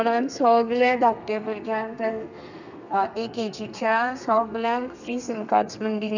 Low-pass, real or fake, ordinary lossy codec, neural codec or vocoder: 7.2 kHz; fake; none; codec, 32 kHz, 1.9 kbps, SNAC